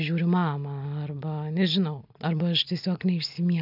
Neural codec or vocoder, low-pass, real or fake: none; 5.4 kHz; real